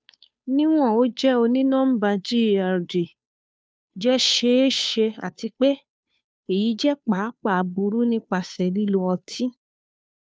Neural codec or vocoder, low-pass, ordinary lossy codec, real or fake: codec, 16 kHz, 2 kbps, FunCodec, trained on Chinese and English, 25 frames a second; none; none; fake